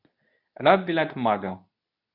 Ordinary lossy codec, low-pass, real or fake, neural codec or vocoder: none; 5.4 kHz; fake; codec, 24 kHz, 0.9 kbps, WavTokenizer, medium speech release version 2